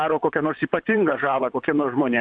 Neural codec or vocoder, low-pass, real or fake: vocoder, 44.1 kHz, 128 mel bands, Pupu-Vocoder; 9.9 kHz; fake